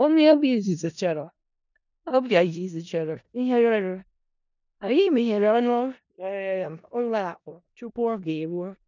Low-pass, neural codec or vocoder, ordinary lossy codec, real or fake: 7.2 kHz; codec, 16 kHz in and 24 kHz out, 0.4 kbps, LongCat-Audio-Codec, four codebook decoder; none; fake